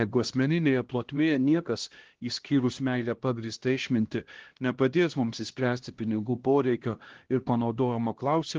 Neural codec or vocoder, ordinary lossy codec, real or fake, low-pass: codec, 16 kHz, 1 kbps, X-Codec, HuBERT features, trained on LibriSpeech; Opus, 16 kbps; fake; 7.2 kHz